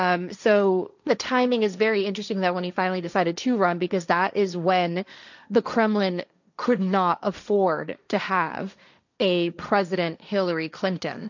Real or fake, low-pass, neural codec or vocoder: fake; 7.2 kHz; codec, 16 kHz, 1.1 kbps, Voila-Tokenizer